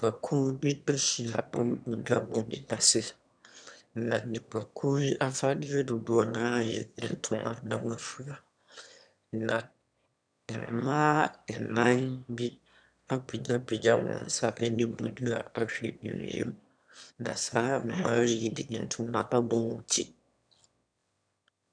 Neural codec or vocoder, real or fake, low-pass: autoencoder, 22.05 kHz, a latent of 192 numbers a frame, VITS, trained on one speaker; fake; 9.9 kHz